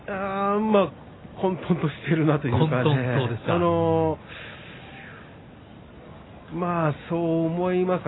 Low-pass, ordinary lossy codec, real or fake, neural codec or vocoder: 7.2 kHz; AAC, 16 kbps; real; none